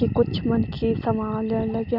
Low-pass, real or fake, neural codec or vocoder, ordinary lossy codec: 5.4 kHz; real; none; none